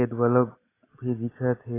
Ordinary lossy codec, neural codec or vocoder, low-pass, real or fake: AAC, 16 kbps; none; 3.6 kHz; real